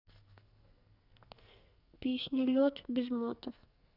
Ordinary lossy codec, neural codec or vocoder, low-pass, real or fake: none; codec, 44.1 kHz, 2.6 kbps, SNAC; 5.4 kHz; fake